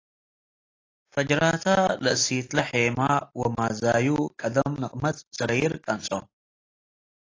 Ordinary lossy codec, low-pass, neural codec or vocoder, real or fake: AAC, 32 kbps; 7.2 kHz; none; real